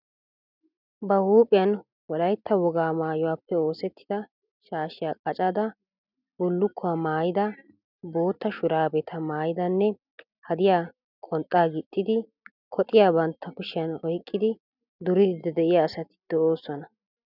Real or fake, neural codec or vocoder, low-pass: real; none; 5.4 kHz